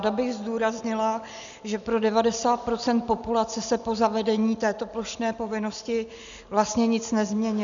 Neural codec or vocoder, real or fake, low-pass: none; real; 7.2 kHz